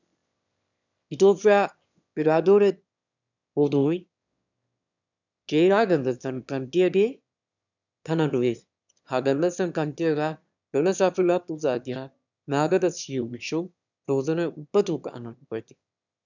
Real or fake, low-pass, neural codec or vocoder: fake; 7.2 kHz; autoencoder, 22.05 kHz, a latent of 192 numbers a frame, VITS, trained on one speaker